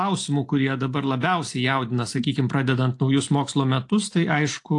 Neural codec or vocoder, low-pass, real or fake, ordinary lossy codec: none; 10.8 kHz; real; AAC, 48 kbps